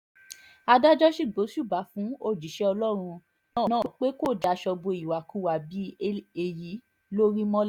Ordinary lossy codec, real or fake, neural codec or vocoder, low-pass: none; real; none; 19.8 kHz